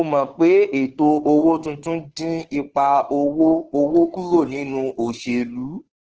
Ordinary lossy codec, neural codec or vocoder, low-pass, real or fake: Opus, 16 kbps; codec, 16 kHz, 2 kbps, FunCodec, trained on Chinese and English, 25 frames a second; 7.2 kHz; fake